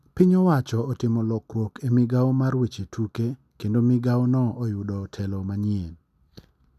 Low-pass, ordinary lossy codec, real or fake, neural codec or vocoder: 14.4 kHz; none; real; none